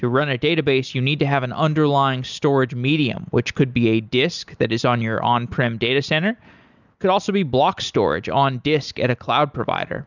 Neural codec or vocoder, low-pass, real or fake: none; 7.2 kHz; real